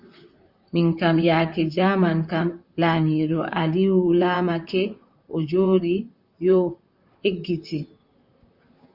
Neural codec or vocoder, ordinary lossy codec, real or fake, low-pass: vocoder, 44.1 kHz, 128 mel bands, Pupu-Vocoder; Opus, 64 kbps; fake; 5.4 kHz